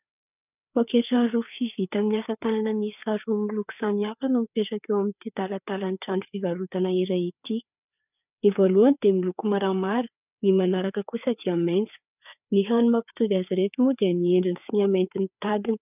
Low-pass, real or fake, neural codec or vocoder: 3.6 kHz; fake; codec, 16 kHz, 4 kbps, FreqCodec, larger model